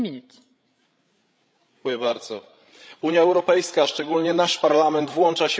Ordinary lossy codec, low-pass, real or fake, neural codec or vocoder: none; none; fake; codec, 16 kHz, 16 kbps, FreqCodec, larger model